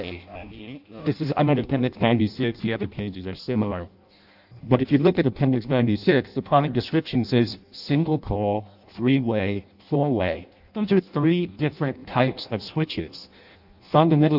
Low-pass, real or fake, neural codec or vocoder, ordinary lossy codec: 5.4 kHz; fake; codec, 16 kHz in and 24 kHz out, 0.6 kbps, FireRedTTS-2 codec; AAC, 48 kbps